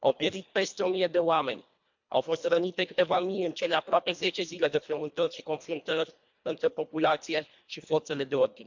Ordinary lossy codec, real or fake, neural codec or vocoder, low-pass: MP3, 64 kbps; fake; codec, 24 kHz, 1.5 kbps, HILCodec; 7.2 kHz